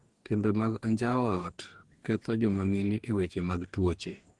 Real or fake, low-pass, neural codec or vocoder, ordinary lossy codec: fake; 10.8 kHz; codec, 44.1 kHz, 2.6 kbps, DAC; Opus, 24 kbps